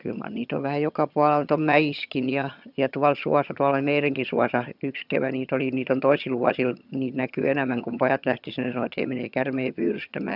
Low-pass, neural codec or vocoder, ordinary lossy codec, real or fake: 5.4 kHz; vocoder, 22.05 kHz, 80 mel bands, HiFi-GAN; none; fake